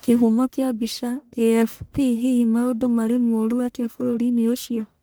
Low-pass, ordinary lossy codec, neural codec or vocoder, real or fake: none; none; codec, 44.1 kHz, 1.7 kbps, Pupu-Codec; fake